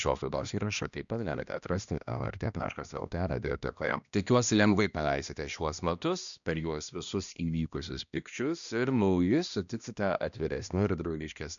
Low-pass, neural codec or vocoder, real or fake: 7.2 kHz; codec, 16 kHz, 1 kbps, X-Codec, HuBERT features, trained on balanced general audio; fake